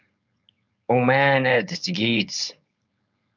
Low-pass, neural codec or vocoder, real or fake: 7.2 kHz; codec, 16 kHz, 4.8 kbps, FACodec; fake